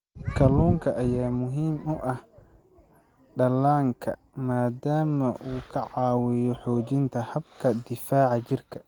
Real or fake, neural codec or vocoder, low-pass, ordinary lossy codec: real; none; 19.8 kHz; Opus, 24 kbps